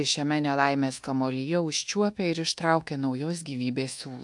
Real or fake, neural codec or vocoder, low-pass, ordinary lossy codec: fake; codec, 24 kHz, 1.2 kbps, DualCodec; 10.8 kHz; AAC, 64 kbps